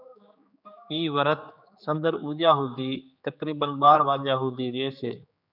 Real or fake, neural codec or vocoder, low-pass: fake; codec, 16 kHz, 4 kbps, X-Codec, HuBERT features, trained on general audio; 5.4 kHz